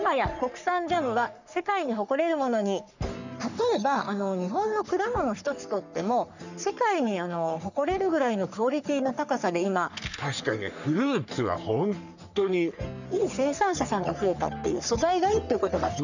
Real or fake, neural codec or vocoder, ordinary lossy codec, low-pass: fake; codec, 44.1 kHz, 3.4 kbps, Pupu-Codec; none; 7.2 kHz